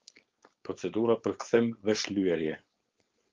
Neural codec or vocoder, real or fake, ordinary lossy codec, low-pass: codec, 16 kHz, 4.8 kbps, FACodec; fake; Opus, 32 kbps; 7.2 kHz